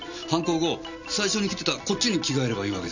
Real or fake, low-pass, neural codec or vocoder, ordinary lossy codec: real; 7.2 kHz; none; none